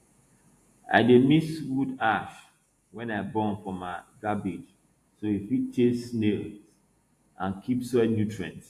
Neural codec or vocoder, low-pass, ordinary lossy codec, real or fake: vocoder, 48 kHz, 128 mel bands, Vocos; 14.4 kHz; AAC, 64 kbps; fake